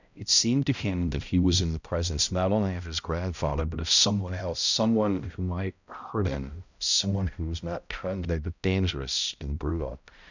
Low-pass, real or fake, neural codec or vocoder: 7.2 kHz; fake; codec, 16 kHz, 0.5 kbps, X-Codec, HuBERT features, trained on balanced general audio